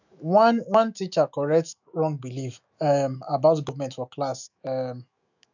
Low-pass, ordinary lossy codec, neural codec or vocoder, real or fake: 7.2 kHz; none; autoencoder, 48 kHz, 128 numbers a frame, DAC-VAE, trained on Japanese speech; fake